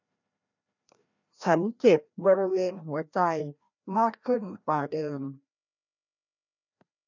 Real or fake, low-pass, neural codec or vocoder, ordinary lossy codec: fake; 7.2 kHz; codec, 16 kHz, 1 kbps, FreqCodec, larger model; none